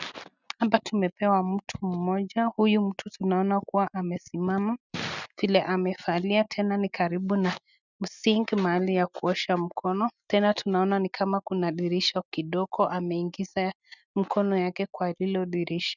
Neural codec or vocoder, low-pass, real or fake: none; 7.2 kHz; real